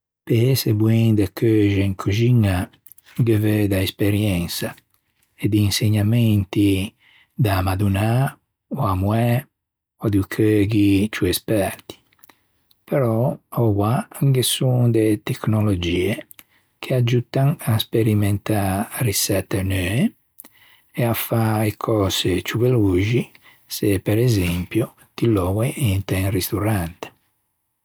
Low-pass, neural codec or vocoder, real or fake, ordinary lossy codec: none; none; real; none